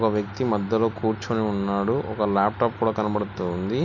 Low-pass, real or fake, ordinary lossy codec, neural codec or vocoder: 7.2 kHz; real; none; none